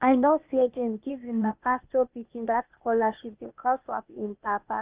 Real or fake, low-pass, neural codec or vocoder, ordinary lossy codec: fake; 3.6 kHz; codec, 16 kHz, 0.8 kbps, ZipCodec; Opus, 32 kbps